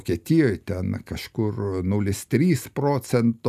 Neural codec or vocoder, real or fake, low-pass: none; real; 14.4 kHz